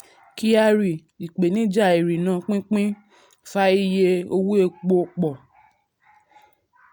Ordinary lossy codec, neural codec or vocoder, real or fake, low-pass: none; none; real; none